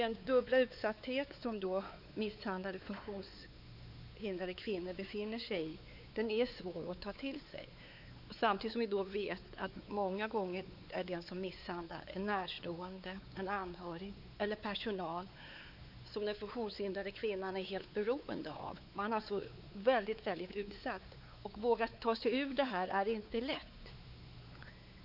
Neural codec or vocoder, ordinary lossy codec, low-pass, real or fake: codec, 16 kHz, 4 kbps, X-Codec, WavLM features, trained on Multilingual LibriSpeech; none; 5.4 kHz; fake